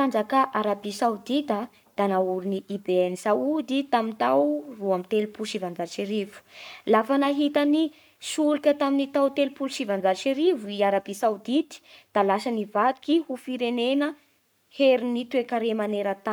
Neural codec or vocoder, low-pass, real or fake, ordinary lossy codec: codec, 44.1 kHz, 7.8 kbps, Pupu-Codec; none; fake; none